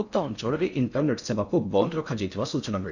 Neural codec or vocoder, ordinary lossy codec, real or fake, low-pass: codec, 16 kHz in and 24 kHz out, 0.6 kbps, FocalCodec, streaming, 4096 codes; none; fake; 7.2 kHz